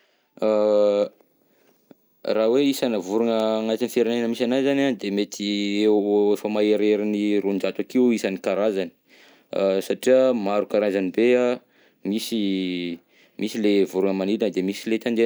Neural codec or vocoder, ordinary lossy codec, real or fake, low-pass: none; none; real; none